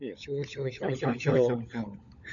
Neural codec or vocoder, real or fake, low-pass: codec, 16 kHz, 16 kbps, FunCodec, trained on LibriTTS, 50 frames a second; fake; 7.2 kHz